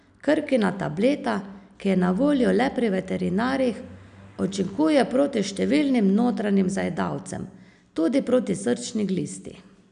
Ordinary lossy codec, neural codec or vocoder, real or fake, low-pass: none; none; real; 9.9 kHz